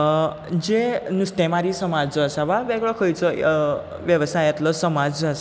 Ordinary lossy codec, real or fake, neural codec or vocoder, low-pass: none; real; none; none